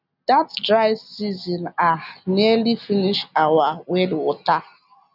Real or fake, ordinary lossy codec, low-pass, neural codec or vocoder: real; none; 5.4 kHz; none